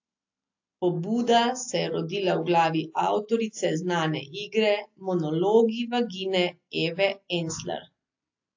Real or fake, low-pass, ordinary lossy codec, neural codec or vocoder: real; 7.2 kHz; AAC, 48 kbps; none